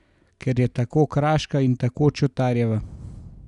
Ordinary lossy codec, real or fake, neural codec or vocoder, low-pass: none; real; none; 10.8 kHz